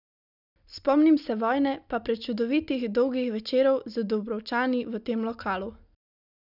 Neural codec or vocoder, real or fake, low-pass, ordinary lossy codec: none; real; 5.4 kHz; none